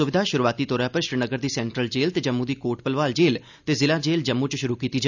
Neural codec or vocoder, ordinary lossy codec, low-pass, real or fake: none; none; 7.2 kHz; real